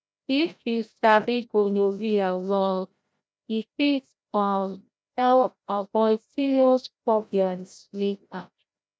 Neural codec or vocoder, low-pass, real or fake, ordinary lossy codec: codec, 16 kHz, 0.5 kbps, FreqCodec, larger model; none; fake; none